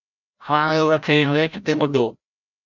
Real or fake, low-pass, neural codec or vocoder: fake; 7.2 kHz; codec, 16 kHz, 0.5 kbps, FreqCodec, larger model